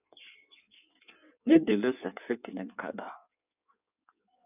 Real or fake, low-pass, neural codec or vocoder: fake; 3.6 kHz; codec, 16 kHz in and 24 kHz out, 1.1 kbps, FireRedTTS-2 codec